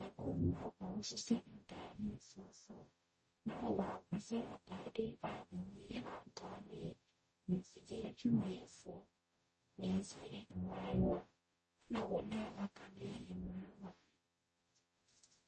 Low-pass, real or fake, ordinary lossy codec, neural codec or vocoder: 10.8 kHz; fake; MP3, 32 kbps; codec, 44.1 kHz, 0.9 kbps, DAC